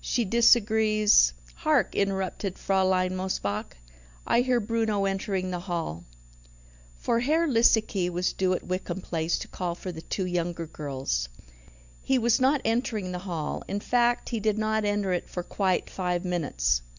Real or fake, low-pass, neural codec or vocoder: real; 7.2 kHz; none